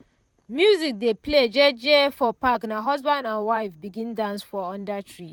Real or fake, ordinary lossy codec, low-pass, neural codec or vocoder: fake; none; 19.8 kHz; vocoder, 44.1 kHz, 128 mel bands, Pupu-Vocoder